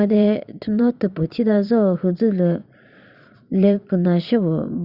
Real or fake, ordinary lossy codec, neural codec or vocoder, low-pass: fake; Opus, 64 kbps; vocoder, 22.05 kHz, 80 mel bands, Vocos; 5.4 kHz